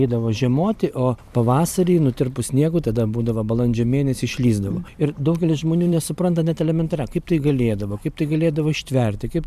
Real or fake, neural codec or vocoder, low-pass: fake; vocoder, 44.1 kHz, 128 mel bands every 512 samples, BigVGAN v2; 14.4 kHz